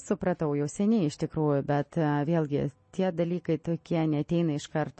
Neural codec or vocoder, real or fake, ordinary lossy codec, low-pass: none; real; MP3, 32 kbps; 10.8 kHz